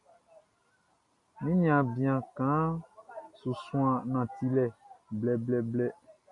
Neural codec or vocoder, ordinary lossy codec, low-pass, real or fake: none; MP3, 64 kbps; 10.8 kHz; real